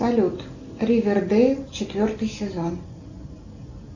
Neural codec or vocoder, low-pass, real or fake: none; 7.2 kHz; real